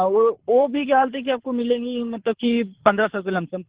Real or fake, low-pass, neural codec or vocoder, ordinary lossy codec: fake; 3.6 kHz; codec, 24 kHz, 6 kbps, HILCodec; Opus, 16 kbps